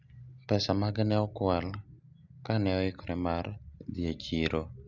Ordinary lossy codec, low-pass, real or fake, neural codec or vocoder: none; 7.2 kHz; real; none